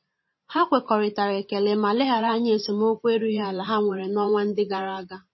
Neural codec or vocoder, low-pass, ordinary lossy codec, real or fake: vocoder, 44.1 kHz, 128 mel bands every 512 samples, BigVGAN v2; 7.2 kHz; MP3, 24 kbps; fake